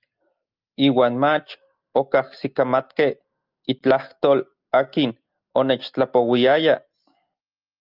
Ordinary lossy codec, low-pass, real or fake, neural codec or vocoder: Opus, 24 kbps; 5.4 kHz; real; none